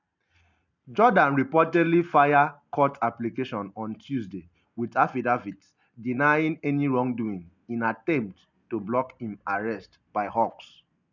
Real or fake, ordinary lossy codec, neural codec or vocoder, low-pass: real; none; none; 7.2 kHz